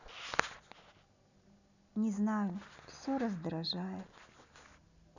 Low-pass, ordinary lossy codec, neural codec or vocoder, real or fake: 7.2 kHz; none; none; real